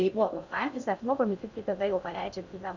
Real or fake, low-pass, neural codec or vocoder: fake; 7.2 kHz; codec, 16 kHz in and 24 kHz out, 0.6 kbps, FocalCodec, streaming, 4096 codes